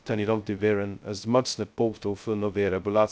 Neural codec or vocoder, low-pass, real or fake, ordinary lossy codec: codec, 16 kHz, 0.2 kbps, FocalCodec; none; fake; none